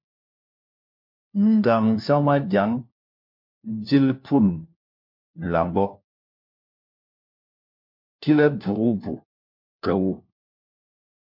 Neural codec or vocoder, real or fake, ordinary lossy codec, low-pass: codec, 16 kHz, 1 kbps, FunCodec, trained on LibriTTS, 50 frames a second; fake; MP3, 48 kbps; 5.4 kHz